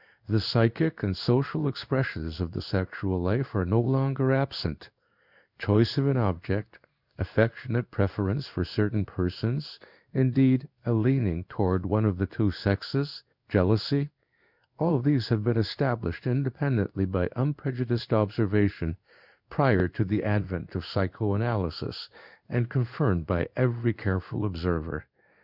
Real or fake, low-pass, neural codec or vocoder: fake; 5.4 kHz; codec, 16 kHz in and 24 kHz out, 1 kbps, XY-Tokenizer